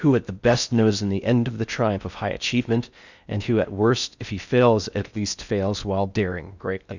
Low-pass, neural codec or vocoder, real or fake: 7.2 kHz; codec, 16 kHz in and 24 kHz out, 0.6 kbps, FocalCodec, streaming, 4096 codes; fake